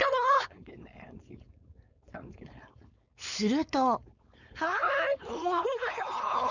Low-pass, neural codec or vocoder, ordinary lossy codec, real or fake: 7.2 kHz; codec, 16 kHz, 4.8 kbps, FACodec; none; fake